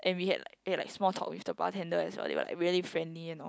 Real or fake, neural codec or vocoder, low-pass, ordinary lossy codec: real; none; none; none